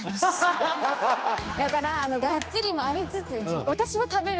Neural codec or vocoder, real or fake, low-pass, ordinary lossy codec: codec, 16 kHz, 2 kbps, X-Codec, HuBERT features, trained on general audio; fake; none; none